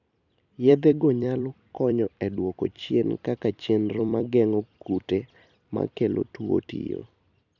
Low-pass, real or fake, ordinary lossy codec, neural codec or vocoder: 7.2 kHz; fake; none; vocoder, 44.1 kHz, 128 mel bands every 256 samples, BigVGAN v2